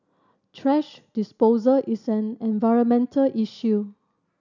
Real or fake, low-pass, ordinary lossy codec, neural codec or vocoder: real; 7.2 kHz; none; none